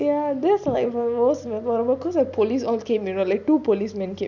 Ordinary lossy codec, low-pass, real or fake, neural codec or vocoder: none; 7.2 kHz; real; none